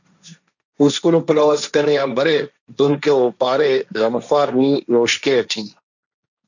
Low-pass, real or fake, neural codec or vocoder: 7.2 kHz; fake; codec, 16 kHz, 1.1 kbps, Voila-Tokenizer